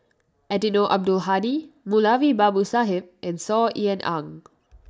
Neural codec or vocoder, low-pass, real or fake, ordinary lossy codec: none; none; real; none